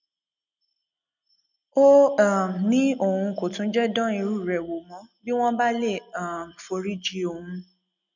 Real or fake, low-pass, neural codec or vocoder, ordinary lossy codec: real; 7.2 kHz; none; none